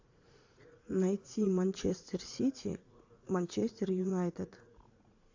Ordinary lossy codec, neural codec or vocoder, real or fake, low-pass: AAC, 48 kbps; vocoder, 44.1 kHz, 80 mel bands, Vocos; fake; 7.2 kHz